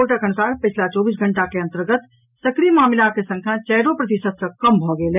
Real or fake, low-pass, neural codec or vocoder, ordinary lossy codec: real; 3.6 kHz; none; none